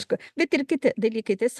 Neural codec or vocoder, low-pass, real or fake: none; 14.4 kHz; real